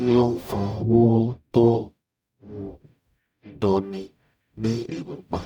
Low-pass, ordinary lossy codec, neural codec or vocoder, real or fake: 19.8 kHz; none; codec, 44.1 kHz, 0.9 kbps, DAC; fake